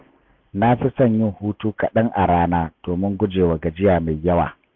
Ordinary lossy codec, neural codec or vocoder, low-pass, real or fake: MP3, 64 kbps; none; 7.2 kHz; real